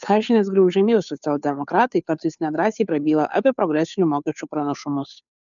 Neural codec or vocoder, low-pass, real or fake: codec, 16 kHz, 2 kbps, FunCodec, trained on Chinese and English, 25 frames a second; 7.2 kHz; fake